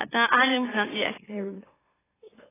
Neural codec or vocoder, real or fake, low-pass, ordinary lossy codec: autoencoder, 44.1 kHz, a latent of 192 numbers a frame, MeloTTS; fake; 3.6 kHz; AAC, 16 kbps